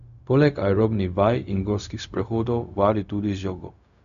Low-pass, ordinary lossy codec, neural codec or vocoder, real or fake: 7.2 kHz; none; codec, 16 kHz, 0.4 kbps, LongCat-Audio-Codec; fake